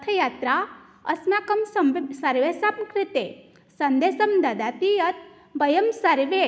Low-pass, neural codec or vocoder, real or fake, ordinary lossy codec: none; none; real; none